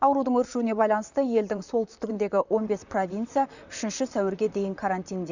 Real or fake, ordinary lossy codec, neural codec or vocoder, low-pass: fake; none; vocoder, 44.1 kHz, 128 mel bands, Pupu-Vocoder; 7.2 kHz